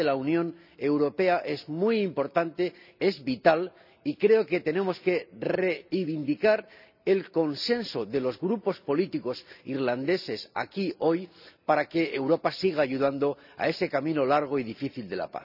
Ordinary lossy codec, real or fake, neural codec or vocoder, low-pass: none; real; none; 5.4 kHz